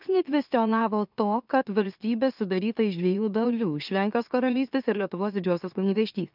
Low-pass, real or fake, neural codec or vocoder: 5.4 kHz; fake; autoencoder, 44.1 kHz, a latent of 192 numbers a frame, MeloTTS